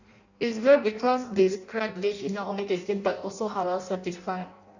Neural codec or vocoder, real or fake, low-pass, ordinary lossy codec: codec, 16 kHz in and 24 kHz out, 0.6 kbps, FireRedTTS-2 codec; fake; 7.2 kHz; none